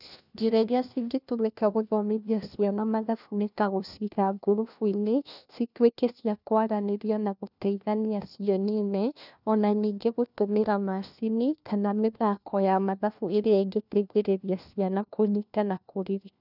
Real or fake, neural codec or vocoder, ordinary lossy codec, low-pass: fake; codec, 16 kHz, 1 kbps, FunCodec, trained on LibriTTS, 50 frames a second; none; 5.4 kHz